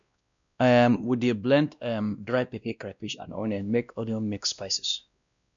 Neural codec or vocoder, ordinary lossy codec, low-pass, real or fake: codec, 16 kHz, 1 kbps, X-Codec, WavLM features, trained on Multilingual LibriSpeech; none; 7.2 kHz; fake